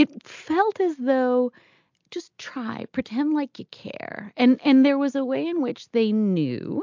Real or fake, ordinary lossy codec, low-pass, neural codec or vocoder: real; MP3, 64 kbps; 7.2 kHz; none